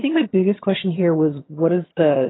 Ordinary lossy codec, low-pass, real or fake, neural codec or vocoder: AAC, 16 kbps; 7.2 kHz; fake; vocoder, 22.05 kHz, 80 mel bands, HiFi-GAN